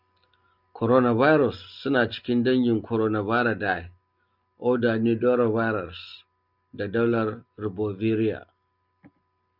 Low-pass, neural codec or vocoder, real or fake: 5.4 kHz; none; real